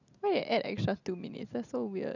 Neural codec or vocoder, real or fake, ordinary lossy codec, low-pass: none; real; none; 7.2 kHz